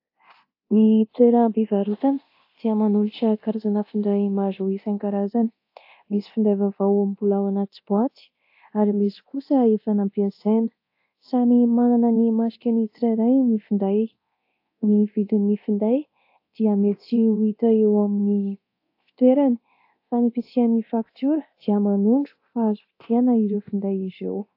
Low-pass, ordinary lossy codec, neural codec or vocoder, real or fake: 5.4 kHz; AAC, 32 kbps; codec, 24 kHz, 0.9 kbps, DualCodec; fake